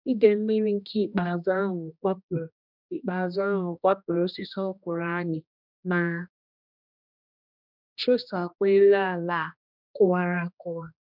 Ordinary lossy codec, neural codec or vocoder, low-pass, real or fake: none; codec, 16 kHz, 1 kbps, X-Codec, HuBERT features, trained on general audio; 5.4 kHz; fake